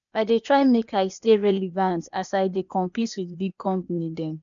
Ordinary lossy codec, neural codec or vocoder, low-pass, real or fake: none; codec, 16 kHz, 0.8 kbps, ZipCodec; 7.2 kHz; fake